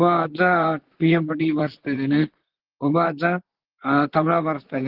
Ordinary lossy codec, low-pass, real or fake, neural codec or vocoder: Opus, 16 kbps; 5.4 kHz; fake; vocoder, 44.1 kHz, 128 mel bands, Pupu-Vocoder